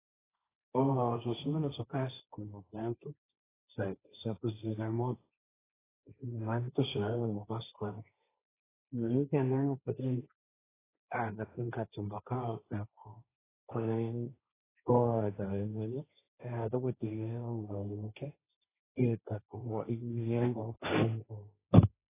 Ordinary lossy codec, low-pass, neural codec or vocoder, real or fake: AAC, 16 kbps; 3.6 kHz; codec, 16 kHz, 1.1 kbps, Voila-Tokenizer; fake